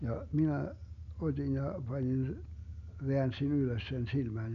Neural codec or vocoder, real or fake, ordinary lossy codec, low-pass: none; real; none; 7.2 kHz